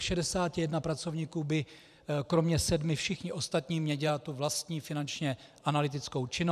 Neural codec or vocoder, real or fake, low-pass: vocoder, 44.1 kHz, 128 mel bands every 512 samples, BigVGAN v2; fake; 14.4 kHz